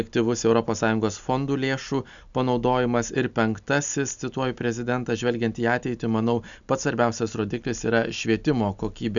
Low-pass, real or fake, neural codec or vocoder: 7.2 kHz; real; none